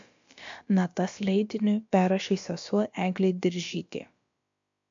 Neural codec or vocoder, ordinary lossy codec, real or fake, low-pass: codec, 16 kHz, about 1 kbps, DyCAST, with the encoder's durations; MP3, 48 kbps; fake; 7.2 kHz